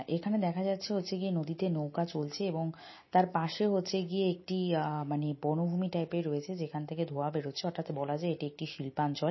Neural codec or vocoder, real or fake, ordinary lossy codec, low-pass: none; real; MP3, 24 kbps; 7.2 kHz